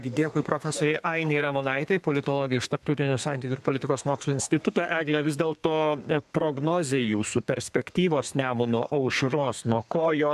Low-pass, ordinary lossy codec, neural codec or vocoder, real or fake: 14.4 kHz; MP3, 96 kbps; codec, 32 kHz, 1.9 kbps, SNAC; fake